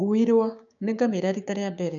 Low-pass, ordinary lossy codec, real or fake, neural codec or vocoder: 7.2 kHz; none; fake; codec, 16 kHz, 6 kbps, DAC